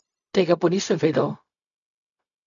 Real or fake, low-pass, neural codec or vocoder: fake; 7.2 kHz; codec, 16 kHz, 0.4 kbps, LongCat-Audio-Codec